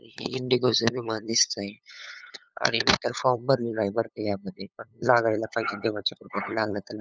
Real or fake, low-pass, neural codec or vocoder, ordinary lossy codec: fake; none; codec, 16 kHz, 8 kbps, FunCodec, trained on LibriTTS, 25 frames a second; none